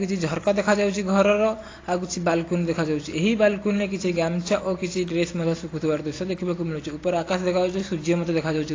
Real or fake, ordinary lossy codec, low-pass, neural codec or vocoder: real; AAC, 32 kbps; 7.2 kHz; none